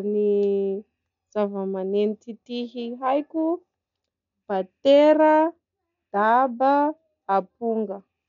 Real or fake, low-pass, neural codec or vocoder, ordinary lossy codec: real; 7.2 kHz; none; none